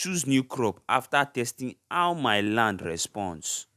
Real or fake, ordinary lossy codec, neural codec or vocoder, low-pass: real; none; none; 14.4 kHz